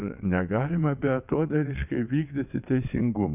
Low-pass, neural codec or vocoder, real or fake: 3.6 kHz; vocoder, 22.05 kHz, 80 mel bands, WaveNeXt; fake